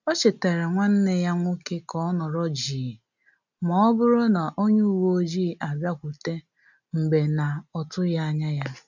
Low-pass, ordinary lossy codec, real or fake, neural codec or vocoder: 7.2 kHz; none; real; none